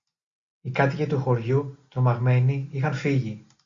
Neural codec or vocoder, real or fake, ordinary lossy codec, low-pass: none; real; Opus, 64 kbps; 7.2 kHz